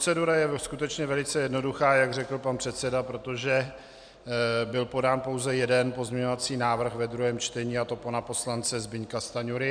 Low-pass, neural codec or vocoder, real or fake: 9.9 kHz; none; real